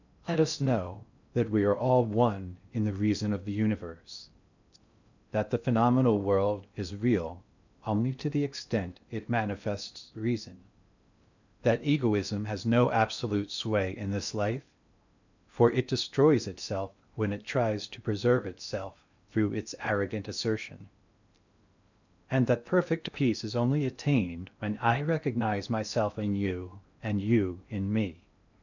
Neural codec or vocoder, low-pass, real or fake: codec, 16 kHz in and 24 kHz out, 0.6 kbps, FocalCodec, streaming, 2048 codes; 7.2 kHz; fake